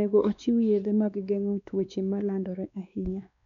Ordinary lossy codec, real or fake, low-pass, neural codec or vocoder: none; fake; 7.2 kHz; codec, 16 kHz, 2 kbps, X-Codec, WavLM features, trained on Multilingual LibriSpeech